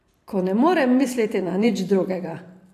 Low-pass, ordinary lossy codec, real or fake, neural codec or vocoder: 14.4 kHz; AAC, 64 kbps; fake; vocoder, 44.1 kHz, 128 mel bands every 512 samples, BigVGAN v2